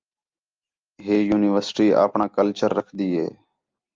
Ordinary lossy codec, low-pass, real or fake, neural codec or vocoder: Opus, 32 kbps; 7.2 kHz; real; none